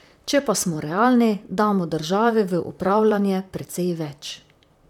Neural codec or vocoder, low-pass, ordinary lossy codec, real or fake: vocoder, 44.1 kHz, 128 mel bands, Pupu-Vocoder; 19.8 kHz; none; fake